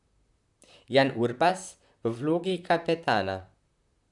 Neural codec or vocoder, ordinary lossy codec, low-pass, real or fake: vocoder, 44.1 kHz, 128 mel bands, Pupu-Vocoder; none; 10.8 kHz; fake